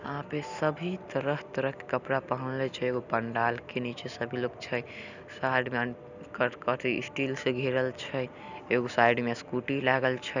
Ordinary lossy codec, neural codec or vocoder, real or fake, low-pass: none; none; real; 7.2 kHz